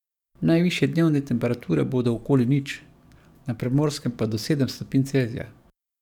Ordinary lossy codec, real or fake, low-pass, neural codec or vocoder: none; fake; 19.8 kHz; codec, 44.1 kHz, 7.8 kbps, DAC